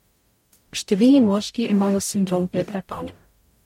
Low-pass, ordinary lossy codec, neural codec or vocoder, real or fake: 19.8 kHz; MP3, 64 kbps; codec, 44.1 kHz, 0.9 kbps, DAC; fake